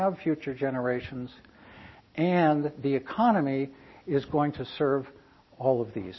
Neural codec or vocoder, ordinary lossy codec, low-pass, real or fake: none; MP3, 24 kbps; 7.2 kHz; real